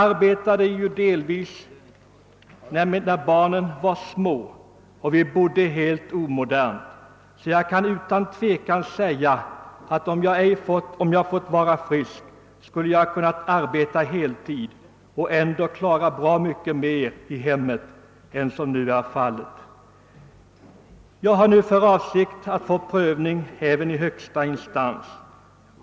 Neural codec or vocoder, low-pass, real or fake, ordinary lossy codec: none; none; real; none